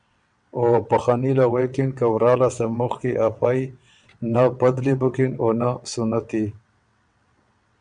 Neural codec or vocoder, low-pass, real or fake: vocoder, 22.05 kHz, 80 mel bands, WaveNeXt; 9.9 kHz; fake